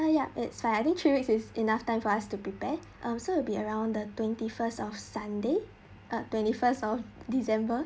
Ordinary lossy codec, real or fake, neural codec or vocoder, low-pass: none; real; none; none